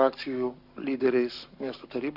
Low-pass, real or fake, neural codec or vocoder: 5.4 kHz; fake; codec, 44.1 kHz, 7.8 kbps, Pupu-Codec